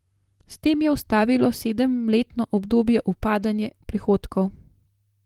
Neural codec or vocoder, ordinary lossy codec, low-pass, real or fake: vocoder, 44.1 kHz, 128 mel bands, Pupu-Vocoder; Opus, 24 kbps; 19.8 kHz; fake